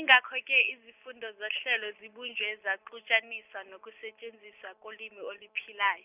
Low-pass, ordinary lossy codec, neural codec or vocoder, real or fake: 3.6 kHz; none; none; real